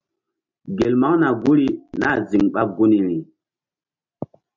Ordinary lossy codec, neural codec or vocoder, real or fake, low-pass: MP3, 64 kbps; none; real; 7.2 kHz